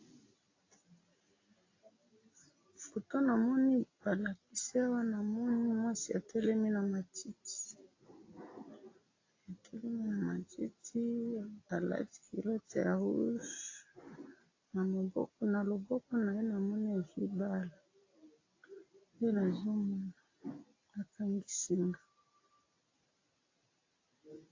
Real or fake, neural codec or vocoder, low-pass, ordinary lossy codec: real; none; 7.2 kHz; AAC, 32 kbps